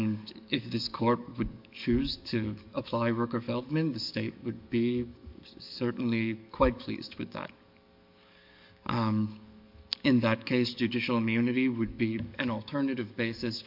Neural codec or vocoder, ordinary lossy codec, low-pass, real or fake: codec, 44.1 kHz, 7.8 kbps, DAC; MP3, 48 kbps; 5.4 kHz; fake